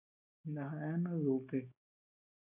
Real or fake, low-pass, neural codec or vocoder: real; 3.6 kHz; none